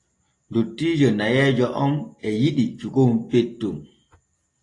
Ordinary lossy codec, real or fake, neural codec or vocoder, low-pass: AAC, 32 kbps; real; none; 10.8 kHz